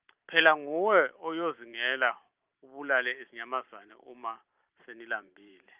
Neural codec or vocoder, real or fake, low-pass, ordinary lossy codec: vocoder, 44.1 kHz, 128 mel bands every 512 samples, BigVGAN v2; fake; 3.6 kHz; Opus, 32 kbps